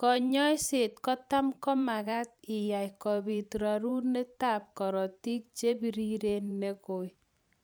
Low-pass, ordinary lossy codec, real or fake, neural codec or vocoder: none; none; fake; vocoder, 44.1 kHz, 128 mel bands every 512 samples, BigVGAN v2